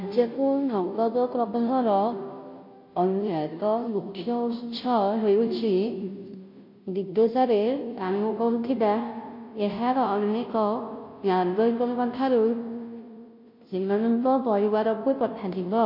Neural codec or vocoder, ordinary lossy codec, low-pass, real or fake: codec, 16 kHz, 0.5 kbps, FunCodec, trained on Chinese and English, 25 frames a second; MP3, 32 kbps; 5.4 kHz; fake